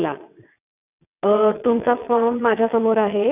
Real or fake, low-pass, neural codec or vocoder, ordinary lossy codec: fake; 3.6 kHz; vocoder, 22.05 kHz, 80 mel bands, WaveNeXt; none